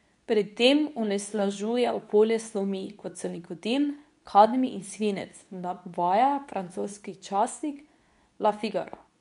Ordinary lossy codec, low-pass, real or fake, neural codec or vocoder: none; 10.8 kHz; fake; codec, 24 kHz, 0.9 kbps, WavTokenizer, medium speech release version 2